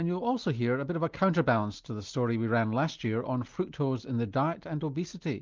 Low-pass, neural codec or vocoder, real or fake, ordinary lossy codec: 7.2 kHz; none; real; Opus, 24 kbps